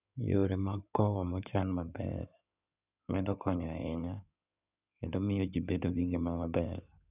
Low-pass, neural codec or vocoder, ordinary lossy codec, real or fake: 3.6 kHz; codec, 16 kHz in and 24 kHz out, 2.2 kbps, FireRedTTS-2 codec; none; fake